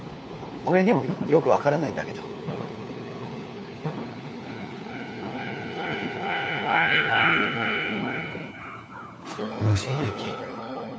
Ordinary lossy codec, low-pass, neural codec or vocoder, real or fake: none; none; codec, 16 kHz, 4 kbps, FunCodec, trained on LibriTTS, 50 frames a second; fake